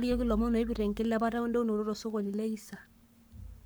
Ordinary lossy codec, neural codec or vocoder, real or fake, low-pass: none; codec, 44.1 kHz, 7.8 kbps, Pupu-Codec; fake; none